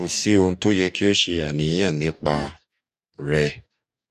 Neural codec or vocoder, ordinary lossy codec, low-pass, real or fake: codec, 44.1 kHz, 2.6 kbps, DAC; none; 14.4 kHz; fake